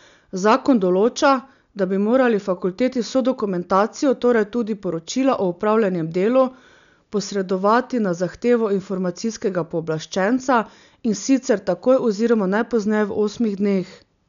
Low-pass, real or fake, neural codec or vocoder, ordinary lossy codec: 7.2 kHz; real; none; none